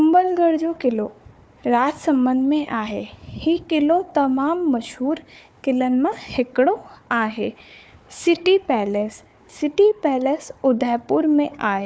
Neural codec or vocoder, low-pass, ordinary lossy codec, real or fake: codec, 16 kHz, 4 kbps, FunCodec, trained on Chinese and English, 50 frames a second; none; none; fake